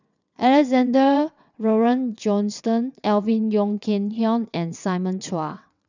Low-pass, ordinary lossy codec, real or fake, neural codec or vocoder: 7.2 kHz; none; fake; vocoder, 22.05 kHz, 80 mel bands, WaveNeXt